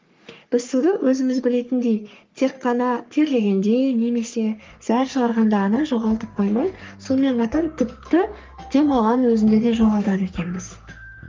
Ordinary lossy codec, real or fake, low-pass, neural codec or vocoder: Opus, 24 kbps; fake; 7.2 kHz; codec, 44.1 kHz, 3.4 kbps, Pupu-Codec